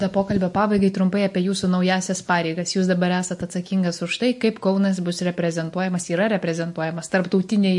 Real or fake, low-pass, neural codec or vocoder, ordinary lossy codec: real; 10.8 kHz; none; MP3, 48 kbps